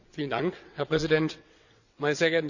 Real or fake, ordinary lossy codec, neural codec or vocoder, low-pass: fake; none; vocoder, 22.05 kHz, 80 mel bands, WaveNeXt; 7.2 kHz